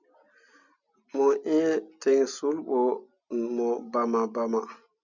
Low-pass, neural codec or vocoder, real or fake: 7.2 kHz; none; real